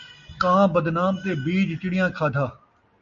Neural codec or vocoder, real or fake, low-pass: none; real; 7.2 kHz